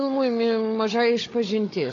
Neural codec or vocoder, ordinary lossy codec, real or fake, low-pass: codec, 16 kHz, 16 kbps, FunCodec, trained on Chinese and English, 50 frames a second; AAC, 32 kbps; fake; 7.2 kHz